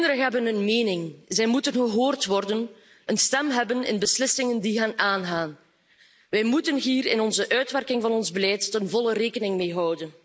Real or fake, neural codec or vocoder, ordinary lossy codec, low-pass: real; none; none; none